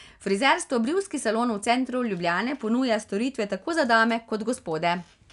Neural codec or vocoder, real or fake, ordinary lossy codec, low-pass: none; real; none; 10.8 kHz